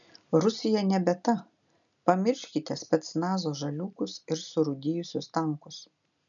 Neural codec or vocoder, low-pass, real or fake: none; 7.2 kHz; real